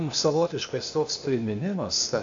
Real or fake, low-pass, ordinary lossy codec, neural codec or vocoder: fake; 7.2 kHz; AAC, 64 kbps; codec, 16 kHz, 0.8 kbps, ZipCodec